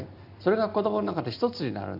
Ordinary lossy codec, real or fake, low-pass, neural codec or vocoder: none; real; 5.4 kHz; none